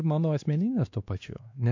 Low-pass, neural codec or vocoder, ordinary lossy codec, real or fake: 7.2 kHz; codec, 16 kHz, 2 kbps, X-Codec, WavLM features, trained on Multilingual LibriSpeech; MP3, 48 kbps; fake